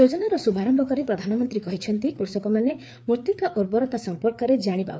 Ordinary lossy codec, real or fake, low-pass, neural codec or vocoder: none; fake; none; codec, 16 kHz, 4 kbps, FreqCodec, larger model